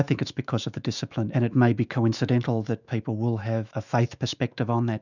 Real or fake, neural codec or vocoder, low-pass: fake; autoencoder, 48 kHz, 128 numbers a frame, DAC-VAE, trained on Japanese speech; 7.2 kHz